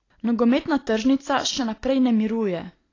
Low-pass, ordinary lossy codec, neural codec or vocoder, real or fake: 7.2 kHz; AAC, 32 kbps; none; real